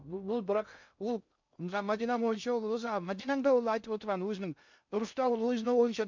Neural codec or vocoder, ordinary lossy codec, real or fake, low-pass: codec, 16 kHz in and 24 kHz out, 0.6 kbps, FocalCodec, streaming, 2048 codes; MP3, 64 kbps; fake; 7.2 kHz